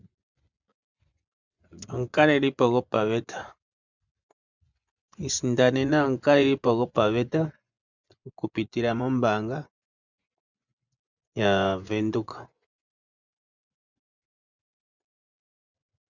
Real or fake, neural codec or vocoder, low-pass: fake; vocoder, 24 kHz, 100 mel bands, Vocos; 7.2 kHz